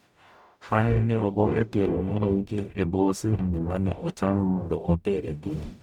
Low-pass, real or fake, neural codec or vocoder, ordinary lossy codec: 19.8 kHz; fake; codec, 44.1 kHz, 0.9 kbps, DAC; none